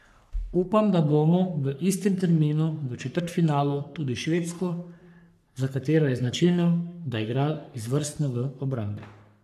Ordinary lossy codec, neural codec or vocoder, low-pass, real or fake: none; codec, 44.1 kHz, 3.4 kbps, Pupu-Codec; 14.4 kHz; fake